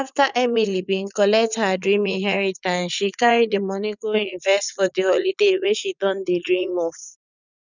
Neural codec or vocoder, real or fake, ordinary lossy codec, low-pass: vocoder, 44.1 kHz, 80 mel bands, Vocos; fake; none; 7.2 kHz